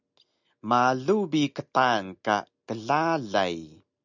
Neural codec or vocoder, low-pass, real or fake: none; 7.2 kHz; real